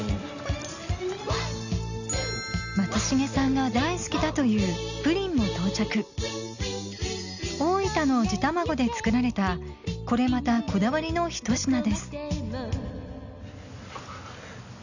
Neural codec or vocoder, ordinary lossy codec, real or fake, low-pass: none; none; real; 7.2 kHz